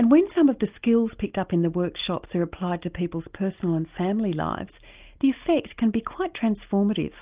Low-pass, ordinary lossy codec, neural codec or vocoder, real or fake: 3.6 kHz; Opus, 32 kbps; none; real